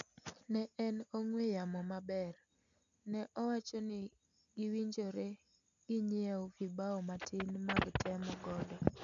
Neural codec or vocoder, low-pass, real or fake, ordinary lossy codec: none; 7.2 kHz; real; none